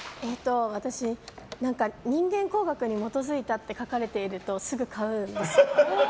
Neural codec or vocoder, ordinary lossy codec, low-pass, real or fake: none; none; none; real